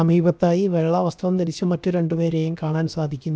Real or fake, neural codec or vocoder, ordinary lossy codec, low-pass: fake; codec, 16 kHz, 0.7 kbps, FocalCodec; none; none